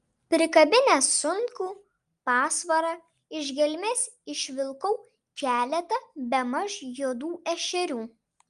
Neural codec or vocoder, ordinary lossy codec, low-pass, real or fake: none; Opus, 32 kbps; 10.8 kHz; real